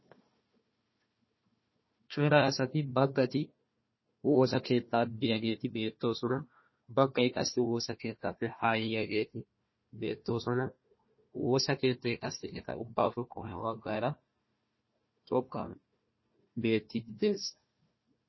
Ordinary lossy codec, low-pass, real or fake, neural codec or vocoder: MP3, 24 kbps; 7.2 kHz; fake; codec, 16 kHz, 1 kbps, FunCodec, trained on Chinese and English, 50 frames a second